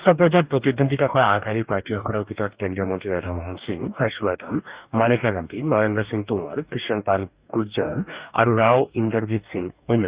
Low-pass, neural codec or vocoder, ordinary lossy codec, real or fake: 3.6 kHz; codec, 44.1 kHz, 2.6 kbps, DAC; Opus, 64 kbps; fake